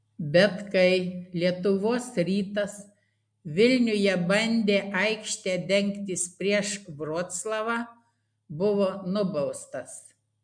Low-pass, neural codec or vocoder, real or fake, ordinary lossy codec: 9.9 kHz; none; real; MP3, 64 kbps